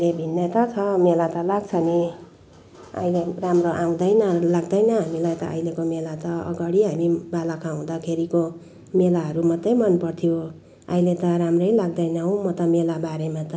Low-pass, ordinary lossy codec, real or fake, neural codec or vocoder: none; none; real; none